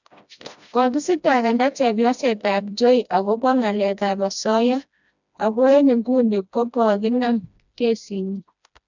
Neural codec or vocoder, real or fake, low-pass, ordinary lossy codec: codec, 16 kHz, 1 kbps, FreqCodec, smaller model; fake; 7.2 kHz; none